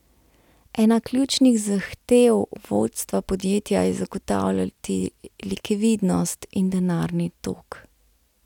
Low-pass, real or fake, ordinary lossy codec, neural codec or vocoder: 19.8 kHz; real; none; none